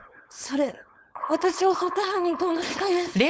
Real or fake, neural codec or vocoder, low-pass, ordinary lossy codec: fake; codec, 16 kHz, 4.8 kbps, FACodec; none; none